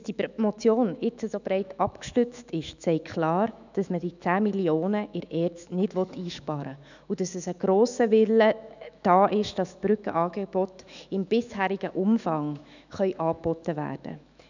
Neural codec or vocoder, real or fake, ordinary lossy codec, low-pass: autoencoder, 48 kHz, 128 numbers a frame, DAC-VAE, trained on Japanese speech; fake; none; 7.2 kHz